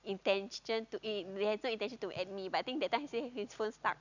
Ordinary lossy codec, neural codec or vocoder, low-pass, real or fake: none; none; 7.2 kHz; real